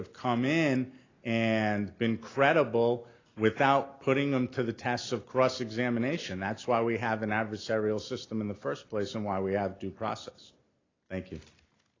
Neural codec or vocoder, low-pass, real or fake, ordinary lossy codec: none; 7.2 kHz; real; AAC, 32 kbps